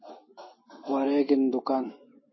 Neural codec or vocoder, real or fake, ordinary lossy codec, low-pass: autoencoder, 48 kHz, 128 numbers a frame, DAC-VAE, trained on Japanese speech; fake; MP3, 24 kbps; 7.2 kHz